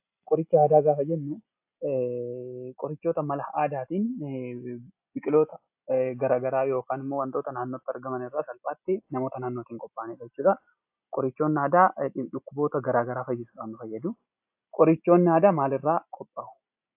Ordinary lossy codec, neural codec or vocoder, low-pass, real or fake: AAC, 32 kbps; none; 3.6 kHz; real